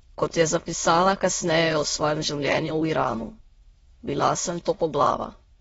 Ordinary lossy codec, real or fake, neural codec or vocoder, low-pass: AAC, 24 kbps; fake; autoencoder, 22.05 kHz, a latent of 192 numbers a frame, VITS, trained on many speakers; 9.9 kHz